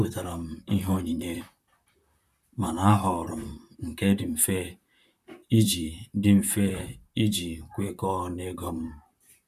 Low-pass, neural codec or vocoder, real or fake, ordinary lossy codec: 14.4 kHz; vocoder, 44.1 kHz, 128 mel bands, Pupu-Vocoder; fake; none